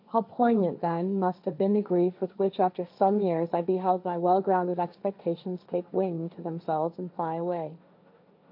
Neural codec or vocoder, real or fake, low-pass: codec, 16 kHz, 1.1 kbps, Voila-Tokenizer; fake; 5.4 kHz